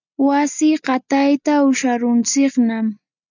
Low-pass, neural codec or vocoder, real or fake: 7.2 kHz; none; real